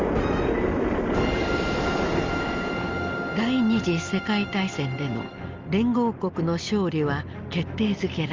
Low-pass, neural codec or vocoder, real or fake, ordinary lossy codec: 7.2 kHz; none; real; Opus, 32 kbps